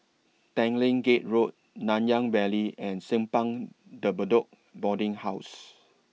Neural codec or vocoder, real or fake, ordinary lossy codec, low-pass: none; real; none; none